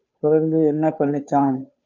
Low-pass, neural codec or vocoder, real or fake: 7.2 kHz; codec, 16 kHz, 2 kbps, FunCodec, trained on Chinese and English, 25 frames a second; fake